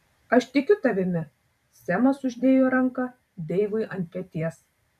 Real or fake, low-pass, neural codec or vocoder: fake; 14.4 kHz; vocoder, 44.1 kHz, 128 mel bands every 256 samples, BigVGAN v2